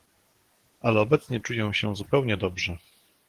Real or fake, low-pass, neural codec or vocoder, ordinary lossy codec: fake; 14.4 kHz; codec, 44.1 kHz, 7.8 kbps, DAC; Opus, 16 kbps